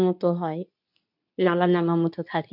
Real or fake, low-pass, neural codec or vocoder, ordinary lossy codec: fake; 5.4 kHz; codec, 24 kHz, 0.9 kbps, WavTokenizer, medium speech release version 2; none